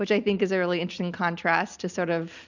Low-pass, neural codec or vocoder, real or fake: 7.2 kHz; none; real